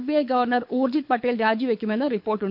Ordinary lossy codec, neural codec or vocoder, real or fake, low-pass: none; codec, 24 kHz, 6 kbps, HILCodec; fake; 5.4 kHz